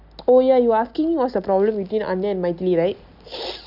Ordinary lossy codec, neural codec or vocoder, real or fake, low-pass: none; none; real; 5.4 kHz